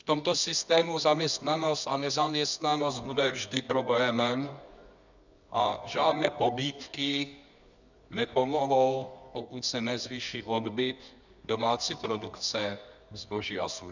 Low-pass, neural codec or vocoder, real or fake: 7.2 kHz; codec, 24 kHz, 0.9 kbps, WavTokenizer, medium music audio release; fake